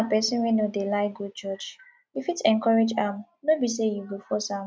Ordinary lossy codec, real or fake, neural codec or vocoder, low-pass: none; real; none; none